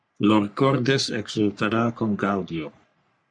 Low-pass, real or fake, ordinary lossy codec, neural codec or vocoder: 9.9 kHz; fake; MP3, 64 kbps; codec, 44.1 kHz, 3.4 kbps, Pupu-Codec